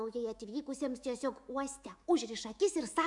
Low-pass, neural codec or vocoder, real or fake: 10.8 kHz; autoencoder, 48 kHz, 128 numbers a frame, DAC-VAE, trained on Japanese speech; fake